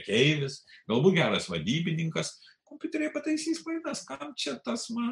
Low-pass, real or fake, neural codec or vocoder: 10.8 kHz; real; none